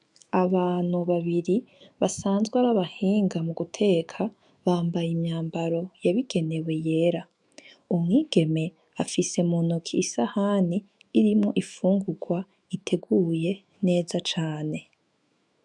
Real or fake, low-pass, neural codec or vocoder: fake; 10.8 kHz; autoencoder, 48 kHz, 128 numbers a frame, DAC-VAE, trained on Japanese speech